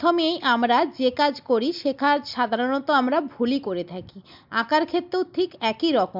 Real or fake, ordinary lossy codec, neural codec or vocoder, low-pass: real; MP3, 48 kbps; none; 5.4 kHz